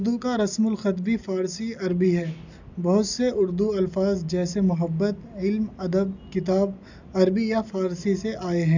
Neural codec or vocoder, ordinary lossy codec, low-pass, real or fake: none; none; 7.2 kHz; real